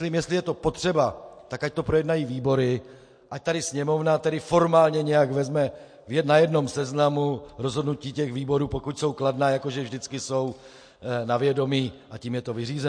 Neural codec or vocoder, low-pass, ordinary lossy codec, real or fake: none; 9.9 kHz; MP3, 48 kbps; real